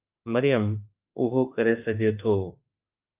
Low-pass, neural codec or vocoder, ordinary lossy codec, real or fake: 3.6 kHz; autoencoder, 48 kHz, 32 numbers a frame, DAC-VAE, trained on Japanese speech; Opus, 24 kbps; fake